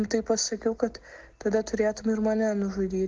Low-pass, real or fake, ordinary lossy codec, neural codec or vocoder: 7.2 kHz; real; Opus, 16 kbps; none